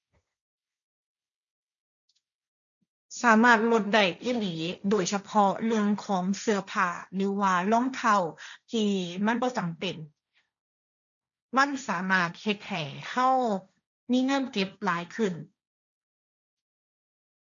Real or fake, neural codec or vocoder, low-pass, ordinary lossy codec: fake; codec, 16 kHz, 1.1 kbps, Voila-Tokenizer; 7.2 kHz; none